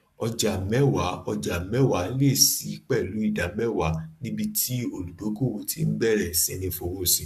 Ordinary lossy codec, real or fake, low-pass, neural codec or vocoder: none; fake; 14.4 kHz; codec, 44.1 kHz, 7.8 kbps, Pupu-Codec